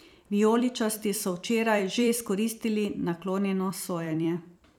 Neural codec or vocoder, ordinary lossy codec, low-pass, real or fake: vocoder, 44.1 kHz, 128 mel bands every 256 samples, BigVGAN v2; none; 19.8 kHz; fake